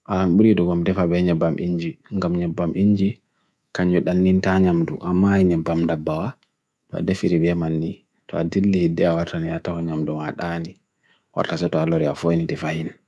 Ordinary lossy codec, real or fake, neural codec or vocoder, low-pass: none; fake; codec, 24 kHz, 3.1 kbps, DualCodec; none